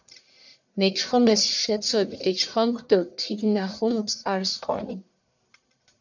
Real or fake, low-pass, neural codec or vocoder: fake; 7.2 kHz; codec, 44.1 kHz, 1.7 kbps, Pupu-Codec